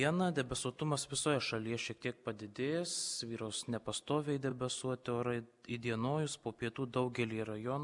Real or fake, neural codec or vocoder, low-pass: real; none; 10.8 kHz